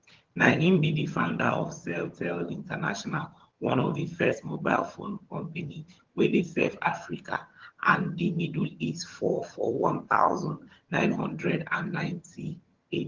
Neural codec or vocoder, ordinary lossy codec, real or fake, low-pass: vocoder, 22.05 kHz, 80 mel bands, HiFi-GAN; Opus, 16 kbps; fake; 7.2 kHz